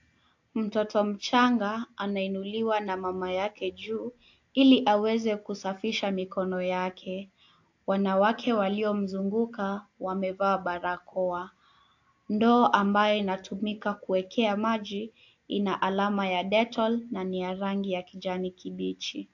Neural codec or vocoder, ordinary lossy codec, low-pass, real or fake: none; AAC, 48 kbps; 7.2 kHz; real